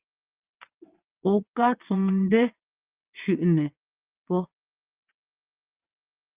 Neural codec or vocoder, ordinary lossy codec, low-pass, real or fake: vocoder, 22.05 kHz, 80 mel bands, WaveNeXt; Opus, 32 kbps; 3.6 kHz; fake